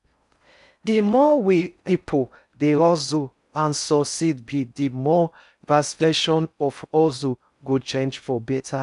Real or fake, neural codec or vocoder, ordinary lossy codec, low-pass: fake; codec, 16 kHz in and 24 kHz out, 0.6 kbps, FocalCodec, streaming, 4096 codes; none; 10.8 kHz